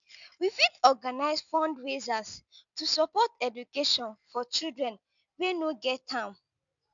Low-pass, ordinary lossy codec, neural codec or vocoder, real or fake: 7.2 kHz; none; none; real